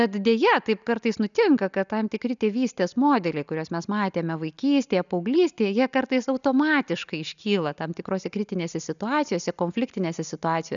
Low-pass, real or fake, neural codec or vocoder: 7.2 kHz; real; none